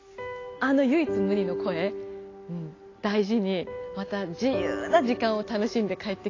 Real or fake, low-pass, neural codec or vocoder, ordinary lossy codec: real; 7.2 kHz; none; AAC, 32 kbps